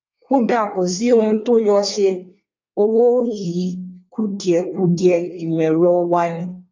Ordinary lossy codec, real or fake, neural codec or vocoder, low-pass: AAC, 48 kbps; fake; codec, 24 kHz, 1 kbps, SNAC; 7.2 kHz